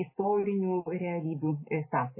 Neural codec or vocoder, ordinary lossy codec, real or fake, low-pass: none; MP3, 16 kbps; real; 3.6 kHz